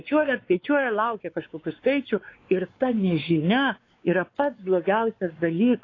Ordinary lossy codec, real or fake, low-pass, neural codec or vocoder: AAC, 32 kbps; fake; 7.2 kHz; codec, 16 kHz, 4 kbps, X-Codec, WavLM features, trained on Multilingual LibriSpeech